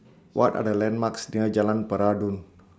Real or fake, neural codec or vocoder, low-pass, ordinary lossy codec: real; none; none; none